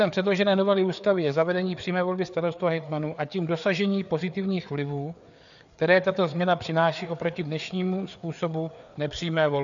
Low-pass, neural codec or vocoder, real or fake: 7.2 kHz; codec, 16 kHz, 4 kbps, FreqCodec, larger model; fake